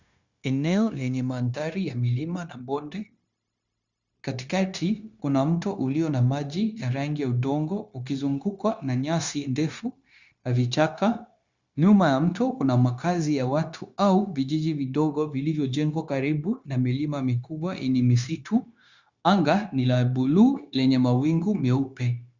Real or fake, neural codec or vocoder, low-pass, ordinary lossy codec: fake; codec, 16 kHz, 0.9 kbps, LongCat-Audio-Codec; 7.2 kHz; Opus, 64 kbps